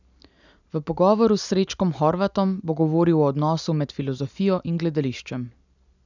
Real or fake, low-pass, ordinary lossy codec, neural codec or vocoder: real; 7.2 kHz; none; none